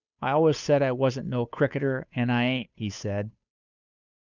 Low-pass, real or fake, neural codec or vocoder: 7.2 kHz; fake; codec, 16 kHz, 8 kbps, FunCodec, trained on Chinese and English, 25 frames a second